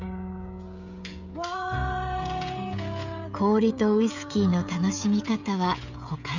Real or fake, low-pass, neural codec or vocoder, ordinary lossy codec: fake; 7.2 kHz; codec, 16 kHz, 16 kbps, FreqCodec, smaller model; none